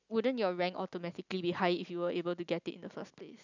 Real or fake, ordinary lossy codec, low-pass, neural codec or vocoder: real; none; 7.2 kHz; none